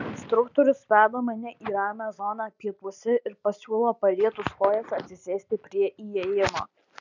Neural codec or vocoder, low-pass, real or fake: none; 7.2 kHz; real